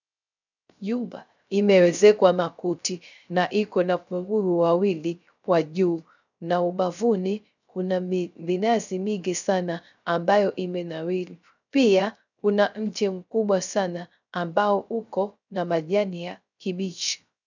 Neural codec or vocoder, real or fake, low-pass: codec, 16 kHz, 0.3 kbps, FocalCodec; fake; 7.2 kHz